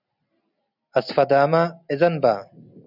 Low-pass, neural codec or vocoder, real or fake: 5.4 kHz; none; real